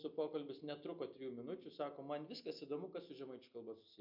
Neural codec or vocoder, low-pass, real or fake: none; 5.4 kHz; real